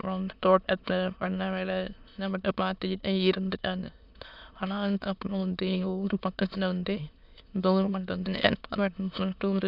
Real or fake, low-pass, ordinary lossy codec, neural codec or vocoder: fake; 5.4 kHz; none; autoencoder, 22.05 kHz, a latent of 192 numbers a frame, VITS, trained on many speakers